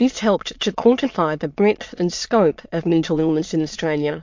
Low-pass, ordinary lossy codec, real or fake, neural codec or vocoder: 7.2 kHz; MP3, 48 kbps; fake; autoencoder, 22.05 kHz, a latent of 192 numbers a frame, VITS, trained on many speakers